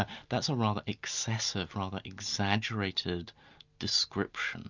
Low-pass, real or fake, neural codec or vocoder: 7.2 kHz; real; none